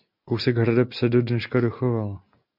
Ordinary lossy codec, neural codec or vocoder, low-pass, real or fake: MP3, 48 kbps; none; 5.4 kHz; real